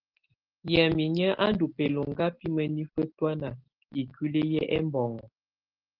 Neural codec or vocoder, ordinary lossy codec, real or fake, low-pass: none; Opus, 16 kbps; real; 5.4 kHz